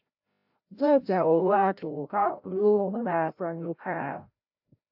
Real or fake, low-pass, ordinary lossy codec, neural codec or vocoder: fake; 5.4 kHz; AAC, 48 kbps; codec, 16 kHz, 0.5 kbps, FreqCodec, larger model